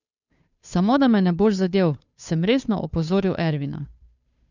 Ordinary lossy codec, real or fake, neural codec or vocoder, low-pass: none; fake; codec, 16 kHz, 2 kbps, FunCodec, trained on Chinese and English, 25 frames a second; 7.2 kHz